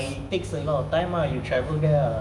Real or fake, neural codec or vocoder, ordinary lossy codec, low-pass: fake; autoencoder, 48 kHz, 128 numbers a frame, DAC-VAE, trained on Japanese speech; none; 10.8 kHz